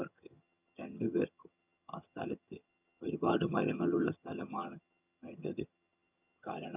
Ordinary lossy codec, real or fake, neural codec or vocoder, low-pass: none; fake; vocoder, 22.05 kHz, 80 mel bands, HiFi-GAN; 3.6 kHz